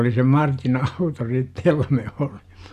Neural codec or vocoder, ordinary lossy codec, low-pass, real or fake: none; none; 14.4 kHz; real